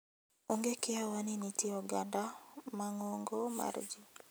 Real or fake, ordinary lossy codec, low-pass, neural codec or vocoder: real; none; none; none